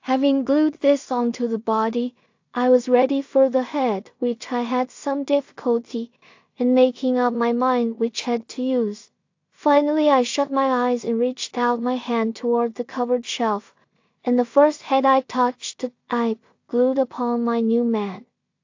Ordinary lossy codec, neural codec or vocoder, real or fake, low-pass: AAC, 48 kbps; codec, 16 kHz in and 24 kHz out, 0.4 kbps, LongCat-Audio-Codec, two codebook decoder; fake; 7.2 kHz